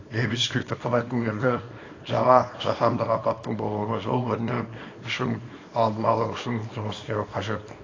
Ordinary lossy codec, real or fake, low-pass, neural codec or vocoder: AAC, 32 kbps; fake; 7.2 kHz; codec, 24 kHz, 0.9 kbps, WavTokenizer, small release